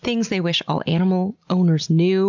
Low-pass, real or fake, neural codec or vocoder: 7.2 kHz; real; none